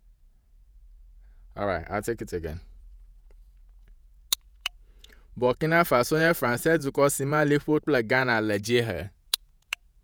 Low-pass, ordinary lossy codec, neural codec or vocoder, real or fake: none; none; vocoder, 48 kHz, 128 mel bands, Vocos; fake